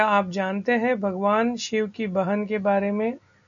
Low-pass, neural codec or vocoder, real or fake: 7.2 kHz; none; real